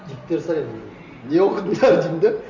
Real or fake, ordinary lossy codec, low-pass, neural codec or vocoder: real; none; 7.2 kHz; none